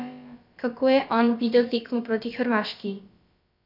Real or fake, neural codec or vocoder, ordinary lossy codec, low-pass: fake; codec, 16 kHz, about 1 kbps, DyCAST, with the encoder's durations; none; 5.4 kHz